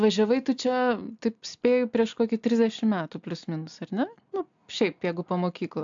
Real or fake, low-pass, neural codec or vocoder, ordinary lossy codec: real; 7.2 kHz; none; AAC, 64 kbps